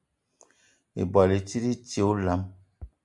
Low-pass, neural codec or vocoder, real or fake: 10.8 kHz; none; real